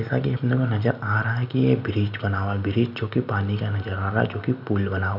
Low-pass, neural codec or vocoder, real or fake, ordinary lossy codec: 5.4 kHz; none; real; none